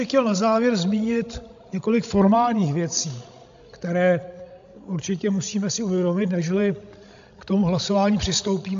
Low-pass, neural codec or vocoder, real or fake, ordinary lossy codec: 7.2 kHz; codec, 16 kHz, 16 kbps, FreqCodec, larger model; fake; AAC, 64 kbps